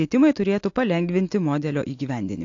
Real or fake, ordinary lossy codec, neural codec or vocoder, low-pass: real; AAC, 48 kbps; none; 7.2 kHz